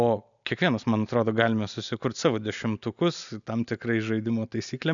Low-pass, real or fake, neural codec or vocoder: 7.2 kHz; real; none